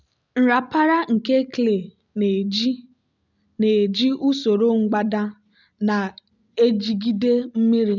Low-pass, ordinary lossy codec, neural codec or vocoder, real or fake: 7.2 kHz; none; none; real